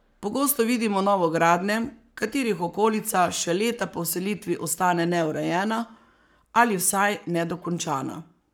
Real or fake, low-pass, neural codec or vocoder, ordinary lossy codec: fake; none; codec, 44.1 kHz, 7.8 kbps, Pupu-Codec; none